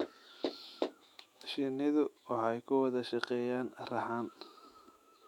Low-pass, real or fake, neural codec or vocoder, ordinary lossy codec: 19.8 kHz; fake; autoencoder, 48 kHz, 128 numbers a frame, DAC-VAE, trained on Japanese speech; MP3, 96 kbps